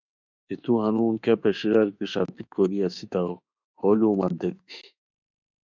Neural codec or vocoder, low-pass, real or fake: autoencoder, 48 kHz, 32 numbers a frame, DAC-VAE, trained on Japanese speech; 7.2 kHz; fake